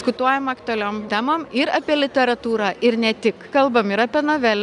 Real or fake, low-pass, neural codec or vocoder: real; 10.8 kHz; none